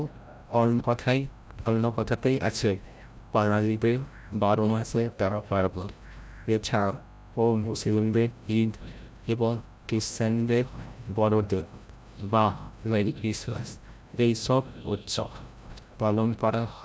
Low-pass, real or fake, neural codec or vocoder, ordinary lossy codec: none; fake; codec, 16 kHz, 0.5 kbps, FreqCodec, larger model; none